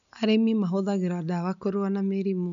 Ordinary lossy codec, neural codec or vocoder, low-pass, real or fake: none; none; 7.2 kHz; real